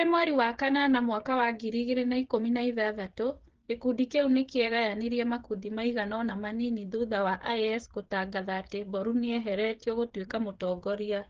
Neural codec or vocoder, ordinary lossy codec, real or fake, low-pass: codec, 16 kHz, 8 kbps, FreqCodec, smaller model; Opus, 16 kbps; fake; 7.2 kHz